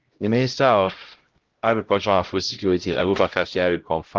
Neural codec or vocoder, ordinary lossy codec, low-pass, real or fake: codec, 16 kHz, 0.5 kbps, X-Codec, HuBERT features, trained on LibriSpeech; Opus, 16 kbps; 7.2 kHz; fake